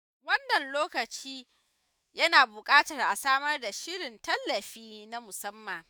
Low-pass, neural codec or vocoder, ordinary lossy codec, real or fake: none; autoencoder, 48 kHz, 128 numbers a frame, DAC-VAE, trained on Japanese speech; none; fake